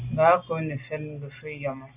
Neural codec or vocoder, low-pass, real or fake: none; 3.6 kHz; real